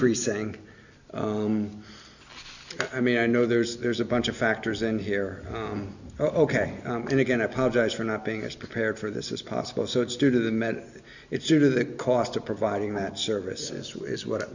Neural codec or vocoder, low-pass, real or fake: none; 7.2 kHz; real